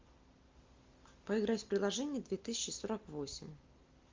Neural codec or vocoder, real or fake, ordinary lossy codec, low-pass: none; real; Opus, 32 kbps; 7.2 kHz